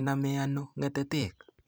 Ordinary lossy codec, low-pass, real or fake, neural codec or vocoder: none; none; fake; vocoder, 44.1 kHz, 128 mel bands every 256 samples, BigVGAN v2